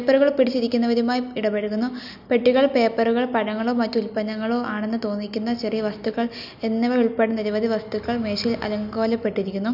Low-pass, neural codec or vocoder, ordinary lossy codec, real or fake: 5.4 kHz; none; none; real